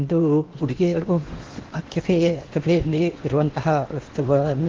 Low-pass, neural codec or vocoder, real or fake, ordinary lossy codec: 7.2 kHz; codec, 16 kHz in and 24 kHz out, 0.8 kbps, FocalCodec, streaming, 65536 codes; fake; Opus, 16 kbps